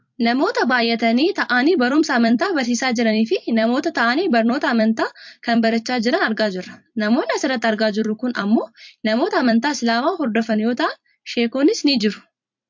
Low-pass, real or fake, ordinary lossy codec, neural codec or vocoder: 7.2 kHz; fake; MP3, 48 kbps; vocoder, 44.1 kHz, 128 mel bands every 256 samples, BigVGAN v2